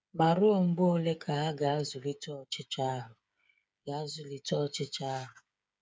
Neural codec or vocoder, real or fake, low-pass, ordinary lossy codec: codec, 16 kHz, 8 kbps, FreqCodec, smaller model; fake; none; none